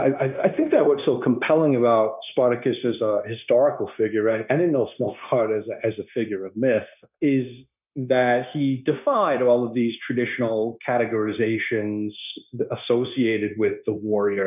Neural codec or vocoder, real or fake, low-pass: codec, 16 kHz, 0.9 kbps, LongCat-Audio-Codec; fake; 3.6 kHz